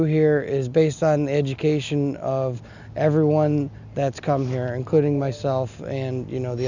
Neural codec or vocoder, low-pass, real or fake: none; 7.2 kHz; real